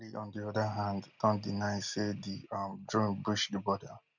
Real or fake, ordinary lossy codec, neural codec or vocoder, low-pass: real; none; none; 7.2 kHz